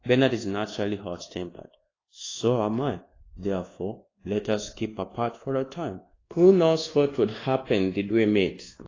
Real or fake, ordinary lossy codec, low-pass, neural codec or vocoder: fake; AAC, 32 kbps; 7.2 kHz; codec, 24 kHz, 1.2 kbps, DualCodec